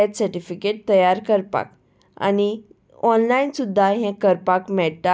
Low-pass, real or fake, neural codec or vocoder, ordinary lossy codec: none; real; none; none